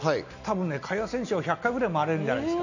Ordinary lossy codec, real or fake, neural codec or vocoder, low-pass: none; real; none; 7.2 kHz